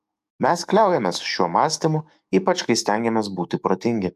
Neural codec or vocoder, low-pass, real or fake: codec, 44.1 kHz, 7.8 kbps, DAC; 14.4 kHz; fake